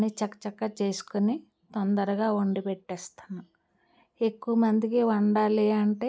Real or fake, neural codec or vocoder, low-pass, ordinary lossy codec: real; none; none; none